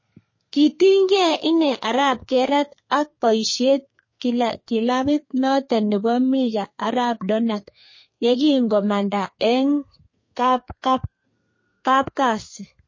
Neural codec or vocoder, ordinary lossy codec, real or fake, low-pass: codec, 32 kHz, 1.9 kbps, SNAC; MP3, 32 kbps; fake; 7.2 kHz